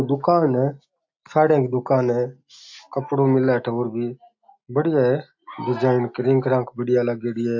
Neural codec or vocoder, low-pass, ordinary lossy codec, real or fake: none; 7.2 kHz; AAC, 48 kbps; real